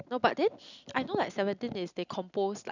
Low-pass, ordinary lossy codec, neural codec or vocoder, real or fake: 7.2 kHz; none; none; real